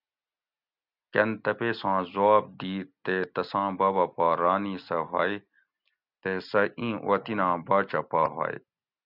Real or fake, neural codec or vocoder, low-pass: real; none; 5.4 kHz